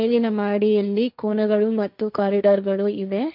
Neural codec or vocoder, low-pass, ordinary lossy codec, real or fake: codec, 16 kHz, 1.1 kbps, Voila-Tokenizer; 5.4 kHz; MP3, 48 kbps; fake